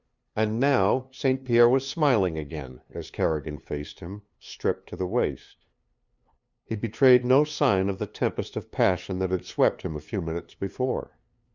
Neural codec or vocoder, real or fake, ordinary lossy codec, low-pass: codec, 16 kHz, 2 kbps, FunCodec, trained on LibriTTS, 25 frames a second; fake; Opus, 64 kbps; 7.2 kHz